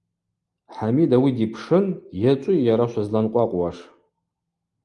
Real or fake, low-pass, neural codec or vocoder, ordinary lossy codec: real; 10.8 kHz; none; Opus, 32 kbps